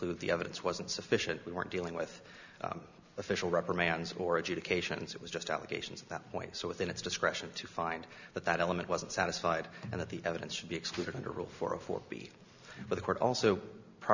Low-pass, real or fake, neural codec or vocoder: 7.2 kHz; real; none